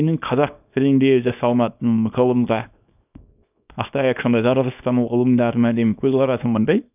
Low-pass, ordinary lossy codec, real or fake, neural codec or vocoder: 3.6 kHz; none; fake; codec, 24 kHz, 0.9 kbps, WavTokenizer, small release